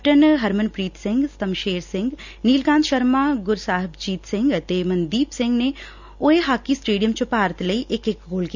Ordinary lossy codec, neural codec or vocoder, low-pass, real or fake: none; none; 7.2 kHz; real